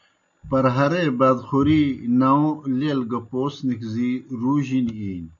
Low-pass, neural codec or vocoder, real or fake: 7.2 kHz; none; real